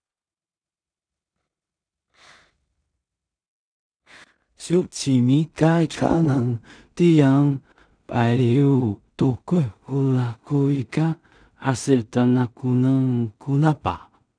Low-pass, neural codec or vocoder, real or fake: 9.9 kHz; codec, 16 kHz in and 24 kHz out, 0.4 kbps, LongCat-Audio-Codec, two codebook decoder; fake